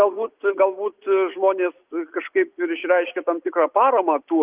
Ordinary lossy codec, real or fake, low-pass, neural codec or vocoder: Opus, 32 kbps; real; 3.6 kHz; none